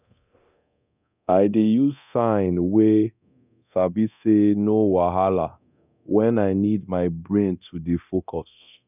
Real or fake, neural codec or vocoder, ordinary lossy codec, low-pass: fake; codec, 24 kHz, 0.9 kbps, DualCodec; none; 3.6 kHz